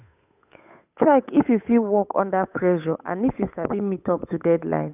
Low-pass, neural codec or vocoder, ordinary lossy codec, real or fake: 3.6 kHz; codec, 16 kHz, 8 kbps, FunCodec, trained on Chinese and English, 25 frames a second; none; fake